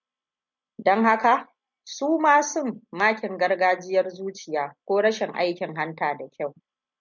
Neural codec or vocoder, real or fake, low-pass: none; real; 7.2 kHz